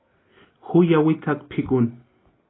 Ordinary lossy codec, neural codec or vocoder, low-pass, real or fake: AAC, 16 kbps; none; 7.2 kHz; real